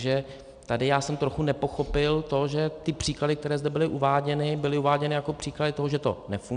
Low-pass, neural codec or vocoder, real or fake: 9.9 kHz; none; real